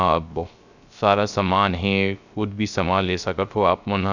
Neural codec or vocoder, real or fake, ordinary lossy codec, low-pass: codec, 16 kHz, 0.3 kbps, FocalCodec; fake; none; 7.2 kHz